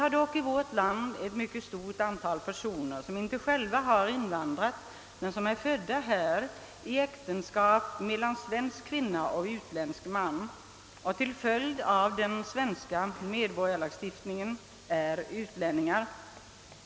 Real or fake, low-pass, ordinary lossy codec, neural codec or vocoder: real; none; none; none